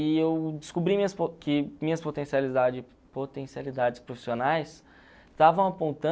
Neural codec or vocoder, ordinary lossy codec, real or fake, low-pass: none; none; real; none